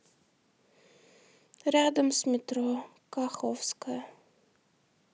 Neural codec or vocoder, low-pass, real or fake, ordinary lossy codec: none; none; real; none